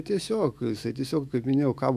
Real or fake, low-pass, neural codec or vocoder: fake; 14.4 kHz; autoencoder, 48 kHz, 128 numbers a frame, DAC-VAE, trained on Japanese speech